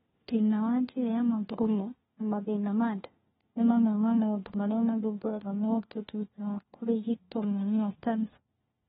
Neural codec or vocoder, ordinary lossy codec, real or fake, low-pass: codec, 16 kHz, 1 kbps, FunCodec, trained on LibriTTS, 50 frames a second; AAC, 16 kbps; fake; 7.2 kHz